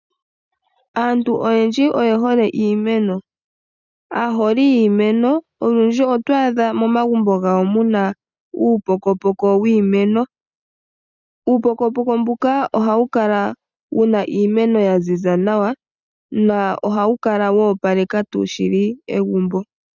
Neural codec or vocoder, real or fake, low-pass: none; real; 7.2 kHz